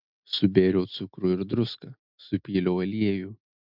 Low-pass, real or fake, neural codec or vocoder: 5.4 kHz; real; none